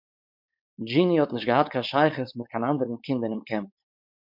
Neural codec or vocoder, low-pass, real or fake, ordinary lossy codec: codec, 16 kHz, 4.8 kbps, FACodec; 5.4 kHz; fake; MP3, 48 kbps